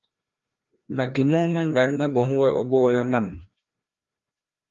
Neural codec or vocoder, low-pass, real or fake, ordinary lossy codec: codec, 16 kHz, 1 kbps, FreqCodec, larger model; 7.2 kHz; fake; Opus, 32 kbps